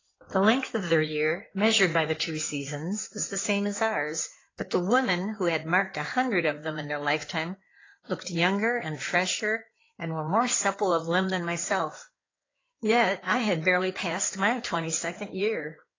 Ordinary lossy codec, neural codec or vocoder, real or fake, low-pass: AAC, 32 kbps; codec, 16 kHz in and 24 kHz out, 2.2 kbps, FireRedTTS-2 codec; fake; 7.2 kHz